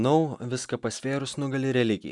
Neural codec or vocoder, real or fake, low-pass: none; real; 10.8 kHz